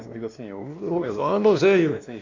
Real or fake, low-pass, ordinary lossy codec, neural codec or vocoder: fake; 7.2 kHz; MP3, 48 kbps; codec, 16 kHz, 2 kbps, X-Codec, WavLM features, trained on Multilingual LibriSpeech